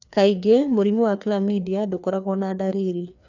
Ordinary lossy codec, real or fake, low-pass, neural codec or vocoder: none; fake; 7.2 kHz; codec, 16 kHz, 2 kbps, FreqCodec, larger model